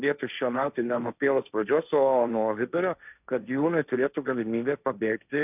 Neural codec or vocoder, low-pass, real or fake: codec, 16 kHz, 1.1 kbps, Voila-Tokenizer; 3.6 kHz; fake